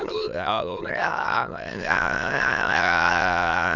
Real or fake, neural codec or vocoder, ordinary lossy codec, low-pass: fake; autoencoder, 22.05 kHz, a latent of 192 numbers a frame, VITS, trained on many speakers; none; 7.2 kHz